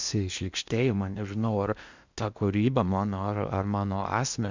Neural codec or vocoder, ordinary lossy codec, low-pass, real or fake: codec, 16 kHz in and 24 kHz out, 0.8 kbps, FocalCodec, streaming, 65536 codes; Opus, 64 kbps; 7.2 kHz; fake